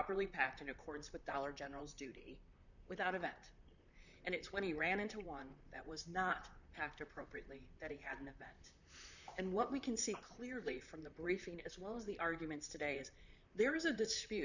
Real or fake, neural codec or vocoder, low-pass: fake; vocoder, 44.1 kHz, 128 mel bands, Pupu-Vocoder; 7.2 kHz